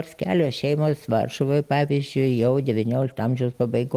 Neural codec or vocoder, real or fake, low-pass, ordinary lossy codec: none; real; 14.4 kHz; Opus, 24 kbps